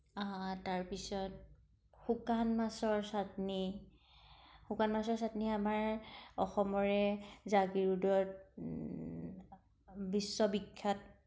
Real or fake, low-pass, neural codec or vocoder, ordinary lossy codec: real; none; none; none